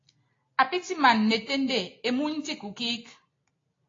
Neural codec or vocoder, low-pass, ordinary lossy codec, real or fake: none; 7.2 kHz; AAC, 32 kbps; real